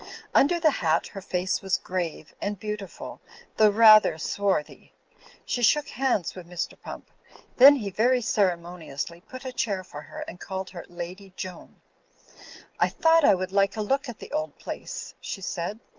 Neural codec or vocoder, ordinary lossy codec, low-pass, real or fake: none; Opus, 24 kbps; 7.2 kHz; real